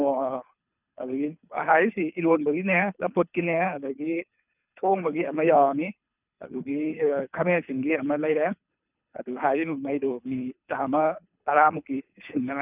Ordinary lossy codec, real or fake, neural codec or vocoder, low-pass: none; fake; codec, 24 kHz, 3 kbps, HILCodec; 3.6 kHz